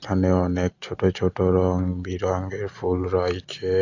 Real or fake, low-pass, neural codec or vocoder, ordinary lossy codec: real; 7.2 kHz; none; AAC, 48 kbps